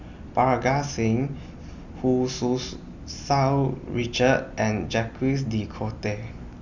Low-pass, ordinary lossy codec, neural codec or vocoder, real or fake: 7.2 kHz; Opus, 64 kbps; none; real